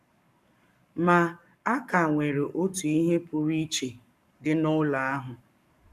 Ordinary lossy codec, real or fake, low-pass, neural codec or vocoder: none; fake; 14.4 kHz; codec, 44.1 kHz, 7.8 kbps, Pupu-Codec